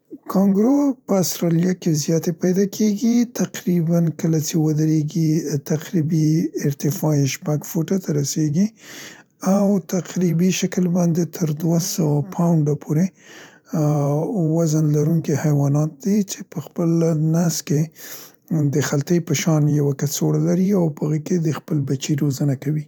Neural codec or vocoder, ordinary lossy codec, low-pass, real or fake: vocoder, 44.1 kHz, 128 mel bands every 512 samples, BigVGAN v2; none; none; fake